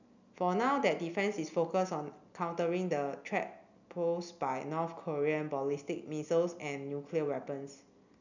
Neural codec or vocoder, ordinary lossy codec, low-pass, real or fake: none; none; 7.2 kHz; real